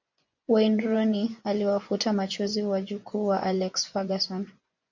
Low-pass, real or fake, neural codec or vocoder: 7.2 kHz; fake; vocoder, 24 kHz, 100 mel bands, Vocos